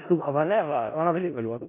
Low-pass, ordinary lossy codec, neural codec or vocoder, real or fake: 3.6 kHz; MP3, 24 kbps; codec, 16 kHz in and 24 kHz out, 0.4 kbps, LongCat-Audio-Codec, four codebook decoder; fake